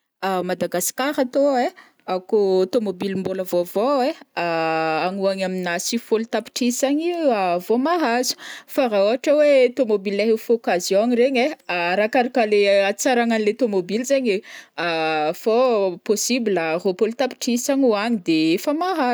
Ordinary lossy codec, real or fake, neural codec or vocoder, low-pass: none; real; none; none